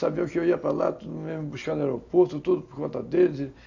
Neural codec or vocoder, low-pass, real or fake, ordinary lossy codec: none; 7.2 kHz; real; none